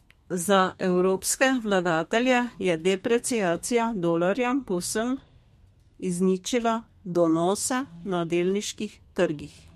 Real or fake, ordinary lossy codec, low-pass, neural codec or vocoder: fake; MP3, 64 kbps; 14.4 kHz; codec, 32 kHz, 1.9 kbps, SNAC